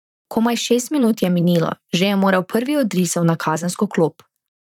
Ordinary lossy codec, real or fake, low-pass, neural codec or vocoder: none; fake; 19.8 kHz; vocoder, 44.1 kHz, 128 mel bands, Pupu-Vocoder